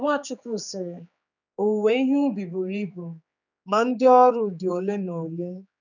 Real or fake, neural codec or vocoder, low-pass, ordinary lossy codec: fake; codec, 16 kHz, 4 kbps, X-Codec, HuBERT features, trained on general audio; 7.2 kHz; none